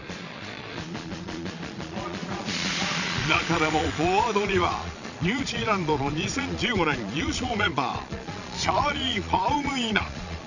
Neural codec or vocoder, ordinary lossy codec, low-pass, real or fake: vocoder, 22.05 kHz, 80 mel bands, Vocos; none; 7.2 kHz; fake